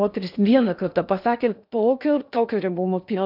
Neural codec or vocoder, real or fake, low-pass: codec, 16 kHz in and 24 kHz out, 0.8 kbps, FocalCodec, streaming, 65536 codes; fake; 5.4 kHz